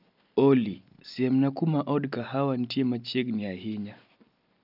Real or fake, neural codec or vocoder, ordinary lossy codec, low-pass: real; none; none; 5.4 kHz